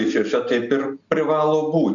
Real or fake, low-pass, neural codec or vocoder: real; 7.2 kHz; none